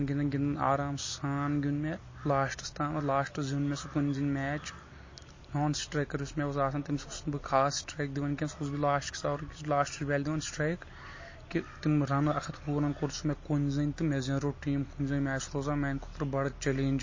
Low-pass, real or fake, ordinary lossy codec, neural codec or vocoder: 7.2 kHz; real; MP3, 32 kbps; none